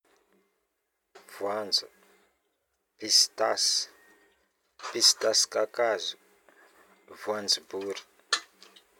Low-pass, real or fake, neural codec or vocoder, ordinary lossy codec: 19.8 kHz; real; none; none